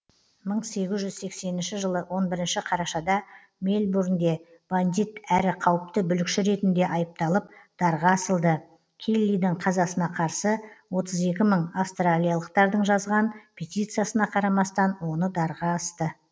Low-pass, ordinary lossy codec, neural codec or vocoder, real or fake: none; none; none; real